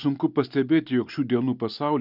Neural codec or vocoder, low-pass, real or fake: none; 5.4 kHz; real